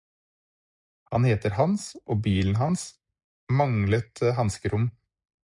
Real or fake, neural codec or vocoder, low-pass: real; none; 10.8 kHz